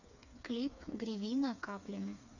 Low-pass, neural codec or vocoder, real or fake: 7.2 kHz; codec, 16 kHz, 4 kbps, FreqCodec, smaller model; fake